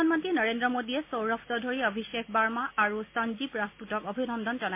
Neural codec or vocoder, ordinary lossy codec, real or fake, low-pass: none; MP3, 24 kbps; real; 3.6 kHz